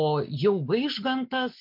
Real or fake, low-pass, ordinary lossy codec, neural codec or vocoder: real; 5.4 kHz; MP3, 48 kbps; none